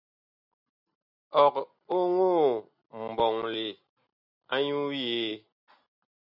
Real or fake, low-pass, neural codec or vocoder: real; 5.4 kHz; none